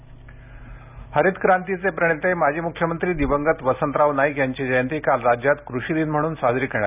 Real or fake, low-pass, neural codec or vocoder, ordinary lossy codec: real; 3.6 kHz; none; none